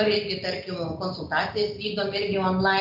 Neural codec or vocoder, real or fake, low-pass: none; real; 5.4 kHz